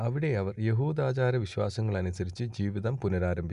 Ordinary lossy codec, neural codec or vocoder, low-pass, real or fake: Opus, 64 kbps; none; 10.8 kHz; real